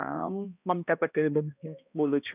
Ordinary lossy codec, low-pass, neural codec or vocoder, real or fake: none; 3.6 kHz; codec, 16 kHz, 1 kbps, X-Codec, HuBERT features, trained on balanced general audio; fake